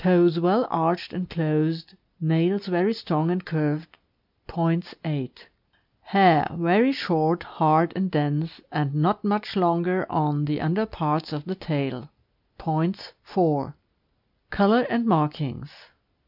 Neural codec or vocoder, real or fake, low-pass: none; real; 5.4 kHz